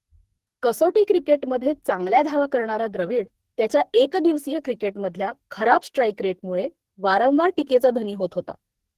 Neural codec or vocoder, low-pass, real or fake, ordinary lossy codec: codec, 44.1 kHz, 2.6 kbps, SNAC; 14.4 kHz; fake; Opus, 16 kbps